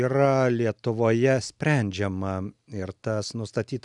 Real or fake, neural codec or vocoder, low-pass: real; none; 10.8 kHz